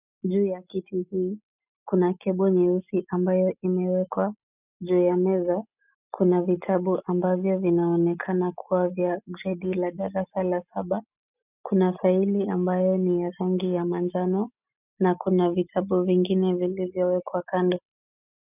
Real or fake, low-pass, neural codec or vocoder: real; 3.6 kHz; none